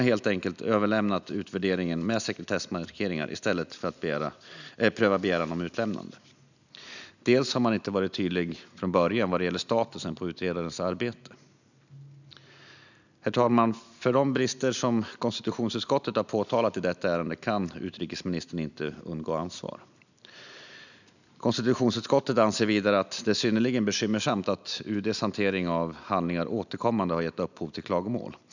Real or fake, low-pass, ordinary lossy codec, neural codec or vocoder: real; 7.2 kHz; none; none